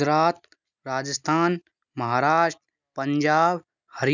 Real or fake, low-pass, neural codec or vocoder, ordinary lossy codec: real; 7.2 kHz; none; none